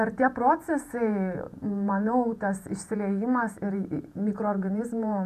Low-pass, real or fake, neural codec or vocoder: 14.4 kHz; fake; vocoder, 48 kHz, 128 mel bands, Vocos